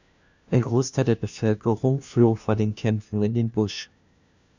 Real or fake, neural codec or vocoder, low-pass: fake; codec, 16 kHz, 1 kbps, FunCodec, trained on LibriTTS, 50 frames a second; 7.2 kHz